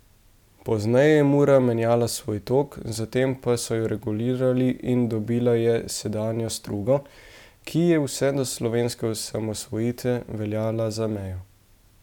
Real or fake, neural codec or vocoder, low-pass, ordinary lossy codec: real; none; 19.8 kHz; none